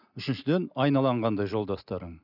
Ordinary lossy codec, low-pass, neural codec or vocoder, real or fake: none; 5.4 kHz; none; real